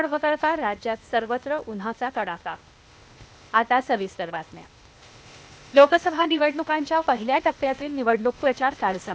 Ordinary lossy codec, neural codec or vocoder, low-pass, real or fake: none; codec, 16 kHz, 0.8 kbps, ZipCodec; none; fake